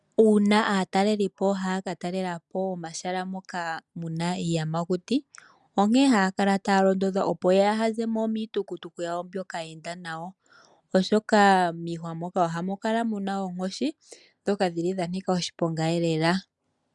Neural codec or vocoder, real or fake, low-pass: none; real; 10.8 kHz